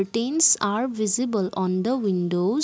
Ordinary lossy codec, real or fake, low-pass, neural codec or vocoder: none; real; none; none